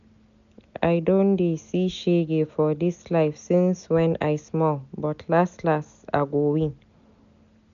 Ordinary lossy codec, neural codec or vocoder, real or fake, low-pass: none; none; real; 7.2 kHz